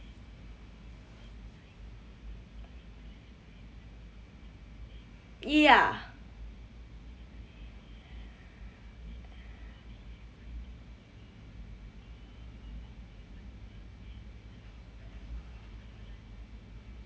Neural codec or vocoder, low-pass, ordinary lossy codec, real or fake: none; none; none; real